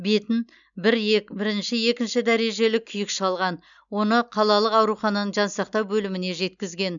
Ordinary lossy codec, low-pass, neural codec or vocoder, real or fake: none; 7.2 kHz; none; real